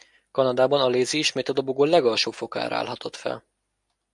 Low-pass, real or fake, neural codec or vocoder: 10.8 kHz; real; none